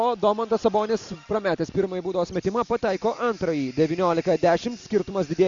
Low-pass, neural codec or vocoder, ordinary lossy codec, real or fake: 7.2 kHz; none; Opus, 64 kbps; real